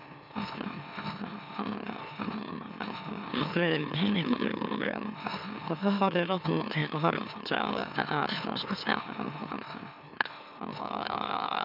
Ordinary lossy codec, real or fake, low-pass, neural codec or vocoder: none; fake; 5.4 kHz; autoencoder, 44.1 kHz, a latent of 192 numbers a frame, MeloTTS